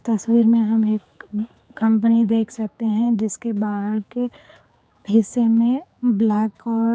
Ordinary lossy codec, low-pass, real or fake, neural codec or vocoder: none; none; fake; codec, 16 kHz, 4 kbps, X-Codec, HuBERT features, trained on general audio